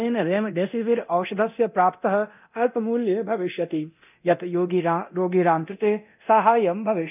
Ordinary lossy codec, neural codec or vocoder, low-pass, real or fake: none; codec, 24 kHz, 0.9 kbps, DualCodec; 3.6 kHz; fake